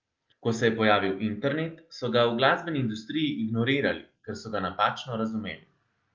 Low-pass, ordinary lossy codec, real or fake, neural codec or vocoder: 7.2 kHz; Opus, 32 kbps; real; none